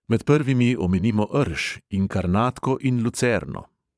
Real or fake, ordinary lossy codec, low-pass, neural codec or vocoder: fake; none; none; vocoder, 22.05 kHz, 80 mel bands, Vocos